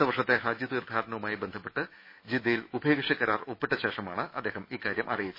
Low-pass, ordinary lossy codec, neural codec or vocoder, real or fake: 5.4 kHz; MP3, 24 kbps; autoencoder, 48 kHz, 128 numbers a frame, DAC-VAE, trained on Japanese speech; fake